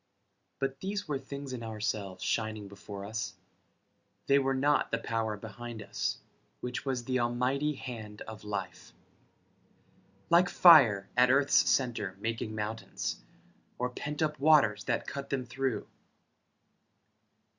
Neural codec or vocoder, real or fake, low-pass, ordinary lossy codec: none; real; 7.2 kHz; Opus, 64 kbps